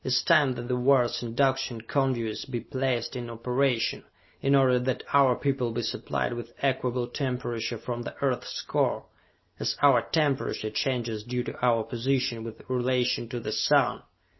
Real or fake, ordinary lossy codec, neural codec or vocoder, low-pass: real; MP3, 24 kbps; none; 7.2 kHz